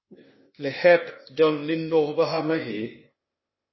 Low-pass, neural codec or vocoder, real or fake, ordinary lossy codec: 7.2 kHz; codec, 16 kHz, 0.8 kbps, ZipCodec; fake; MP3, 24 kbps